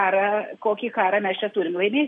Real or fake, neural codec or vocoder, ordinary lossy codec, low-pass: real; none; MP3, 48 kbps; 14.4 kHz